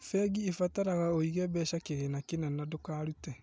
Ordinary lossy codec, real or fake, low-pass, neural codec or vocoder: none; real; none; none